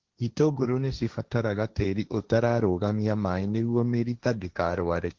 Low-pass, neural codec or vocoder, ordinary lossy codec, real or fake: 7.2 kHz; codec, 16 kHz, 1.1 kbps, Voila-Tokenizer; Opus, 16 kbps; fake